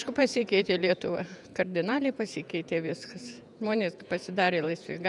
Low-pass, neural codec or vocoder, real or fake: 10.8 kHz; vocoder, 48 kHz, 128 mel bands, Vocos; fake